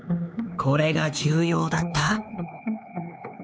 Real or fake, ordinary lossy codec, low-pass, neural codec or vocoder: fake; none; none; codec, 16 kHz, 4 kbps, X-Codec, HuBERT features, trained on LibriSpeech